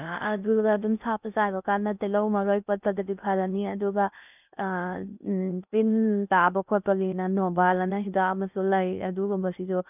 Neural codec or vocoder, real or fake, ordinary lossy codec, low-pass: codec, 16 kHz in and 24 kHz out, 0.6 kbps, FocalCodec, streaming, 2048 codes; fake; none; 3.6 kHz